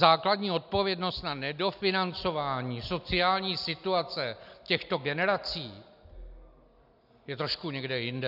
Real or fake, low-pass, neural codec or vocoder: real; 5.4 kHz; none